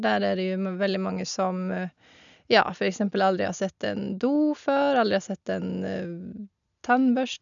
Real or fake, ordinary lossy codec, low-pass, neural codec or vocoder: real; none; 7.2 kHz; none